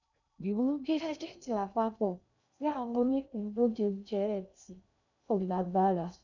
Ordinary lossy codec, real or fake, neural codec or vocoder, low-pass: none; fake; codec, 16 kHz in and 24 kHz out, 0.6 kbps, FocalCodec, streaming, 2048 codes; 7.2 kHz